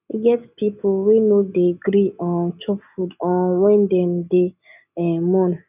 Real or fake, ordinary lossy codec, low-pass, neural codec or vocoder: real; none; 3.6 kHz; none